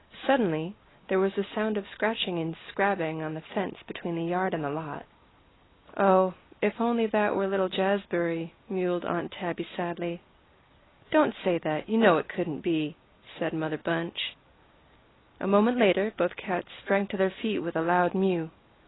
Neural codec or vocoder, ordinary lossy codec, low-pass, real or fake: none; AAC, 16 kbps; 7.2 kHz; real